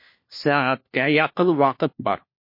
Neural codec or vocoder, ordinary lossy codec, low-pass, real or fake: codec, 16 kHz, 1 kbps, FunCodec, trained on LibriTTS, 50 frames a second; MP3, 32 kbps; 5.4 kHz; fake